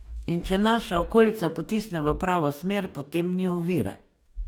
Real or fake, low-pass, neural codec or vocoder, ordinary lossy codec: fake; 19.8 kHz; codec, 44.1 kHz, 2.6 kbps, DAC; none